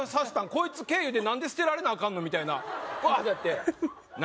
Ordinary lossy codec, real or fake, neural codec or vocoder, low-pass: none; real; none; none